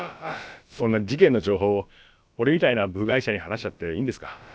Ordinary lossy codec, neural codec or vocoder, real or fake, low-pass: none; codec, 16 kHz, about 1 kbps, DyCAST, with the encoder's durations; fake; none